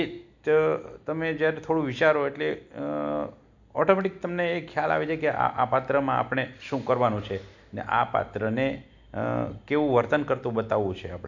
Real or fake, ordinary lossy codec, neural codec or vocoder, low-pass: real; none; none; 7.2 kHz